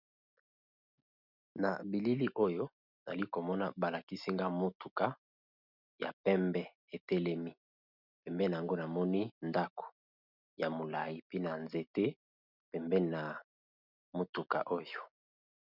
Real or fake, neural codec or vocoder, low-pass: real; none; 5.4 kHz